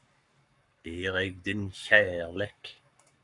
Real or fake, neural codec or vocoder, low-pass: fake; codec, 44.1 kHz, 7.8 kbps, Pupu-Codec; 10.8 kHz